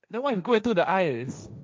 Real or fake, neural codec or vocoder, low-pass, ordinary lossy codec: fake; codec, 16 kHz, 1.1 kbps, Voila-Tokenizer; none; none